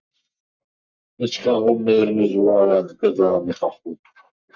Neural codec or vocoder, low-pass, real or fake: codec, 44.1 kHz, 1.7 kbps, Pupu-Codec; 7.2 kHz; fake